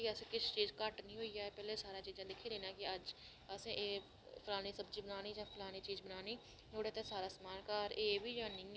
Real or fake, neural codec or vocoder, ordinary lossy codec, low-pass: real; none; none; none